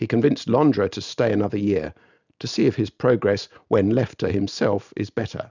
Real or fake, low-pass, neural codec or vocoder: real; 7.2 kHz; none